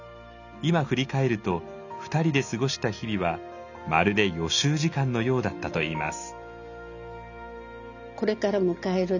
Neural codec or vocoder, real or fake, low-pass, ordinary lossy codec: none; real; 7.2 kHz; none